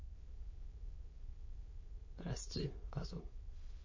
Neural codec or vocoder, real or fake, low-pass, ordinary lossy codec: autoencoder, 22.05 kHz, a latent of 192 numbers a frame, VITS, trained on many speakers; fake; 7.2 kHz; MP3, 32 kbps